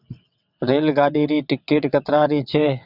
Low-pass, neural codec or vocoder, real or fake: 5.4 kHz; vocoder, 22.05 kHz, 80 mel bands, WaveNeXt; fake